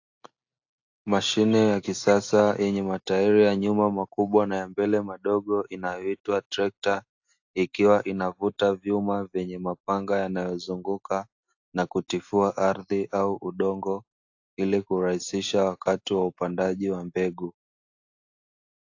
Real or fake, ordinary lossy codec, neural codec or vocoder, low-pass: real; AAC, 48 kbps; none; 7.2 kHz